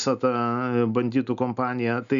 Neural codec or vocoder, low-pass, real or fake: none; 7.2 kHz; real